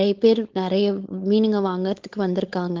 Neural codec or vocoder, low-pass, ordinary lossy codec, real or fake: codec, 16 kHz, 4.8 kbps, FACodec; 7.2 kHz; Opus, 16 kbps; fake